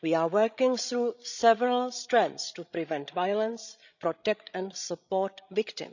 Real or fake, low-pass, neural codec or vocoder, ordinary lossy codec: fake; 7.2 kHz; codec, 16 kHz, 16 kbps, FreqCodec, larger model; none